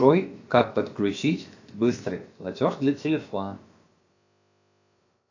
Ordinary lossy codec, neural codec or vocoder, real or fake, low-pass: AAC, 48 kbps; codec, 16 kHz, about 1 kbps, DyCAST, with the encoder's durations; fake; 7.2 kHz